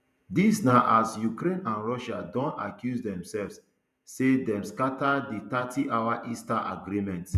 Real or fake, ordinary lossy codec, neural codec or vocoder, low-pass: fake; none; vocoder, 48 kHz, 128 mel bands, Vocos; 14.4 kHz